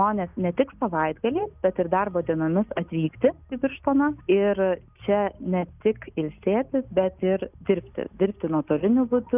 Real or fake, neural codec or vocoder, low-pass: real; none; 3.6 kHz